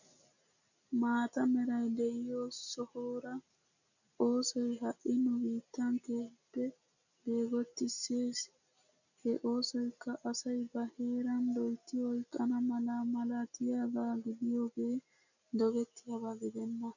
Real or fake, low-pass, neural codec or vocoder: real; 7.2 kHz; none